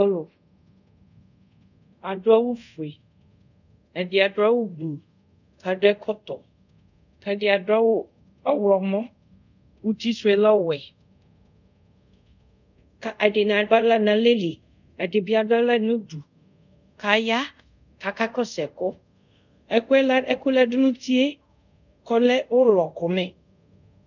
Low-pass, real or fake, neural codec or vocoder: 7.2 kHz; fake; codec, 24 kHz, 0.5 kbps, DualCodec